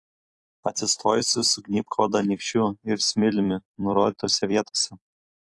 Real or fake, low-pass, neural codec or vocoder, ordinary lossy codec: fake; 10.8 kHz; vocoder, 44.1 kHz, 128 mel bands every 256 samples, BigVGAN v2; AAC, 48 kbps